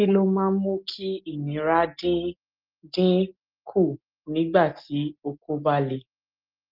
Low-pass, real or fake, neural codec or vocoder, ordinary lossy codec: 5.4 kHz; real; none; Opus, 32 kbps